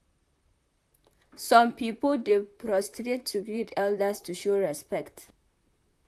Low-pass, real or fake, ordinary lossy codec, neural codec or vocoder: 14.4 kHz; fake; none; vocoder, 44.1 kHz, 128 mel bands, Pupu-Vocoder